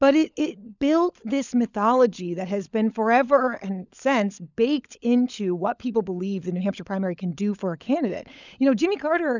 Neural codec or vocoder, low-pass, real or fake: codec, 16 kHz, 16 kbps, FunCodec, trained on LibriTTS, 50 frames a second; 7.2 kHz; fake